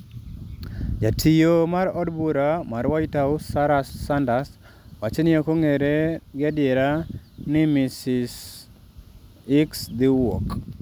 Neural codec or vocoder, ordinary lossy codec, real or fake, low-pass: none; none; real; none